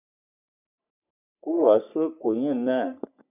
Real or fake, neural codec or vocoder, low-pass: fake; codec, 44.1 kHz, 3.4 kbps, Pupu-Codec; 3.6 kHz